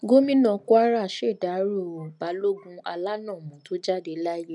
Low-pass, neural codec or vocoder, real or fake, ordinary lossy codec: 10.8 kHz; none; real; none